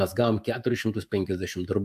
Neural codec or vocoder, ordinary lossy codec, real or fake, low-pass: autoencoder, 48 kHz, 128 numbers a frame, DAC-VAE, trained on Japanese speech; AAC, 96 kbps; fake; 14.4 kHz